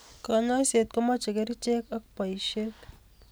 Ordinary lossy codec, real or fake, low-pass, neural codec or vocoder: none; real; none; none